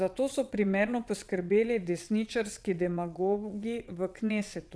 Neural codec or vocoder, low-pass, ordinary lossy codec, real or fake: vocoder, 22.05 kHz, 80 mel bands, WaveNeXt; none; none; fake